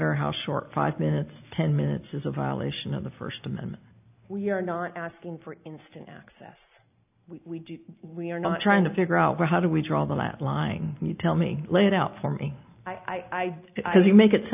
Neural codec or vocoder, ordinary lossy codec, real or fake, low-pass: none; AAC, 32 kbps; real; 3.6 kHz